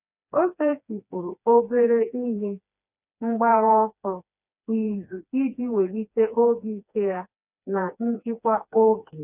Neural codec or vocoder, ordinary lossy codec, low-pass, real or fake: codec, 16 kHz, 2 kbps, FreqCodec, smaller model; none; 3.6 kHz; fake